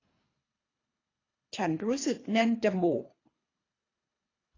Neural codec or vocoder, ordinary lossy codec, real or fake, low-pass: codec, 24 kHz, 3 kbps, HILCodec; AAC, 32 kbps; fake; 7.2 kHz